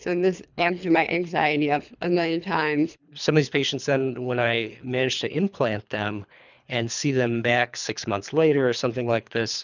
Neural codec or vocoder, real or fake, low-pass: codec, 24 kHz, 3 kbps, HILCodec; fake; 7.2 kHz